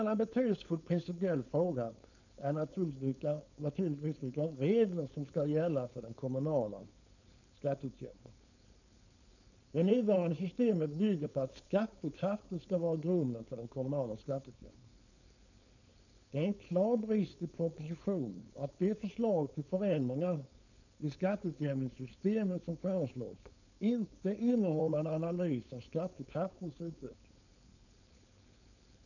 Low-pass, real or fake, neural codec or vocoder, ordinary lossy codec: 7.2 kHz; fake; codec, 16 kHz, 4.8 kbps, FACodec; none